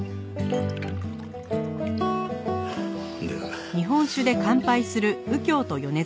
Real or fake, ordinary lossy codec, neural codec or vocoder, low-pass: real; none; none; none